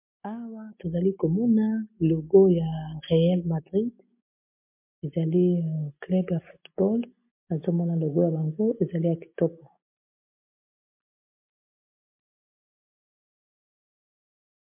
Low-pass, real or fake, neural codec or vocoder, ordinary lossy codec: 3.6 kHz; real; none; MP3, 32 kbps